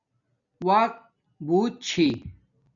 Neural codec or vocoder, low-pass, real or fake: none; 7.2 kHz; real